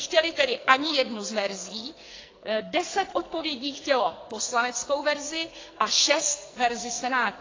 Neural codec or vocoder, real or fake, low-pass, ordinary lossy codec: codec, 44.1 kHz, 2.6 kbps, SNAC; fake; 7.2 kHz; AAC, 32 kbps